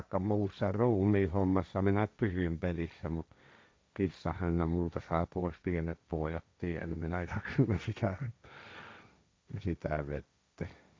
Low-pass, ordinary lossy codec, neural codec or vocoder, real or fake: none; none; codec, 16 kHz, 1.1 kbps, Voila-Tokenizer; fake